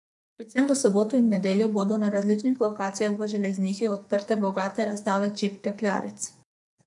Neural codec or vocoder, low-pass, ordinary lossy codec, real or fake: codec, 44.1 kHz, 2.6 kbps, SNAC; 10.8 kHz; MP3, 96 kbps; fake